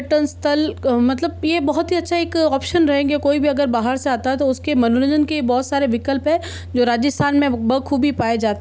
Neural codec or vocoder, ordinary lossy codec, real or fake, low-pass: none; none; real; none